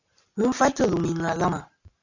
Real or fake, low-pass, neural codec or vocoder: real; 7.2 kHz; none